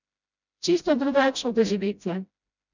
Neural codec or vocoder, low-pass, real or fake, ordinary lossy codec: codec, 16 kHz, 0.5 kbps, FreqCodec, smaller model; 7.2 kHz; fake; none